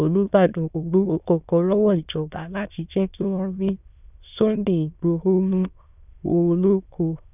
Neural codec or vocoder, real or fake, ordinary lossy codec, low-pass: autoencoder, 22.05 kHz, a latent of 192 numbers a frame, VITS, trained on many speakers; fake; none; 3.6 kHz